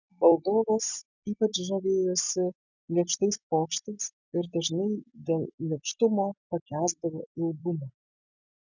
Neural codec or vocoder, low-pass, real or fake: none; 7.2 kHz; real